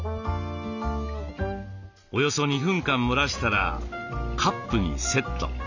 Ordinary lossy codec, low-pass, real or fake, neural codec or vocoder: none; 7.2 kHz; real; none